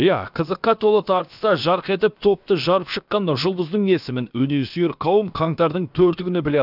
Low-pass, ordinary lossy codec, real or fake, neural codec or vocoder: 5.4 kHz; none; fake; codec, 16 kHz, about 1 kbps, DyCAST, with the encoder's durations